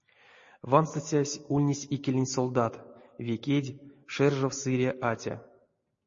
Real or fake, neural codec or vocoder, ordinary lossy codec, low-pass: real; none; MP3, 32 kbps; 7.2 kHz